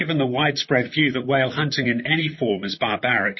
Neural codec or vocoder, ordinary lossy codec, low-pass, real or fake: vocoder, 44.1 kHz, 128 mel bands, Pupu-Vocoder; MP3, 24 kbps; 7.2 kHz; fake